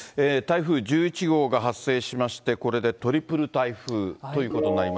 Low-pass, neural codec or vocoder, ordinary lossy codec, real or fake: none; none; none; real